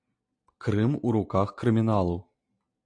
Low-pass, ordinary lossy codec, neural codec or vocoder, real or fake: 9.9 kHz; AAC, 64 kbps; none; real